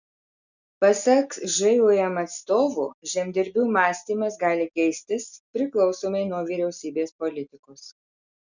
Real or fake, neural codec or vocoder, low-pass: real; none; 7.2 kHz